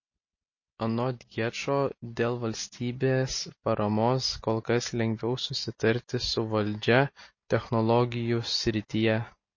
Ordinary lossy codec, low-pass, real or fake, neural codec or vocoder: MP3, 32 kbps; 7.2 kHz; real; none